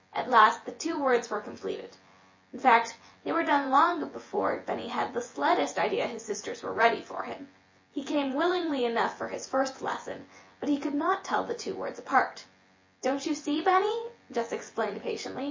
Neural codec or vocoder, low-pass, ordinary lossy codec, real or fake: vocoder, 24 kHz, 100 mel bands, Vocos; 7.2 kHz; MP3, 32 kbps; fake